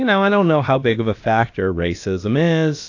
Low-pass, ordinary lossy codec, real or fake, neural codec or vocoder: 7.2 kHz; AAC, 48 kbps; fake; codec, 16 kHz, about 1 kbps, DyCAST, with the encoder's durations